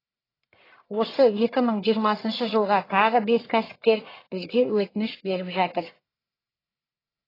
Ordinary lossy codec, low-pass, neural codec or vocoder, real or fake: AAC, 24 kbps; 5.4 kHz; codec, 44.1 kHz, 1.7 kbps, Pupu-Codec; fake